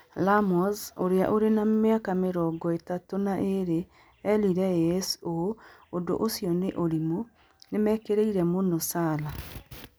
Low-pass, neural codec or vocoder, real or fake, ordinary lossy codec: none; none; real; none